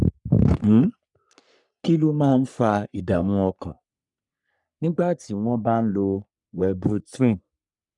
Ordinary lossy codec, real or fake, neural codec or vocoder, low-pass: none; fake; codec, 44.1 kHz, 3.4 kbps, Pupu-Codec; 10.8 kHz